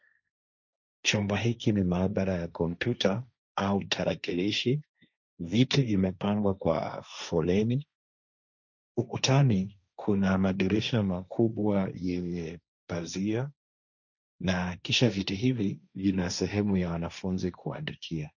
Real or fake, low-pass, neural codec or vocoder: fake; 7.2 kHz; codec, 16 kHz, 1.1 kbps, Voila-Tokenizer